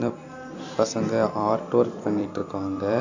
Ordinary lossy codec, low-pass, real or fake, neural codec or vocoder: AAC, 48 kbps; 7.2 kHz; fake; vocoder, 44.1 kHz, 128 mel bands every 256 samples, BigVGAN v2